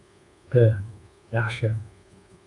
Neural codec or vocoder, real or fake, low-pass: codec, 24 kHz, 1.2 kbps, DualCodec; fake; 10.8 kHz